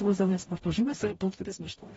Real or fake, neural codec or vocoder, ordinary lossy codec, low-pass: fake; codec, 44.1 kHz, 0.9 kbps, DAC; AAC, 24 kbps; 19.8 kHz